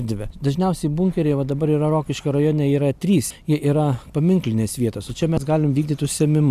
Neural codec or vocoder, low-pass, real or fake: none; 14.4 kHz; real